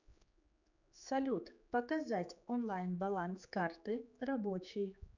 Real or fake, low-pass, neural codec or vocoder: fake; 7.2 kHz; codec, 16 kHz, 4 kbps, X-Codec, HuBERT features, trained on general audio